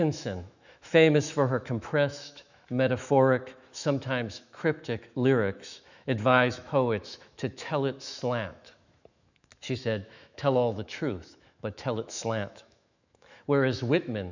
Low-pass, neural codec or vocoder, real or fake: 7.2 kHz; autoencoder, 48 kHz, 128 numbers a frame, DAC-VAE, trained on Japanese speech; fake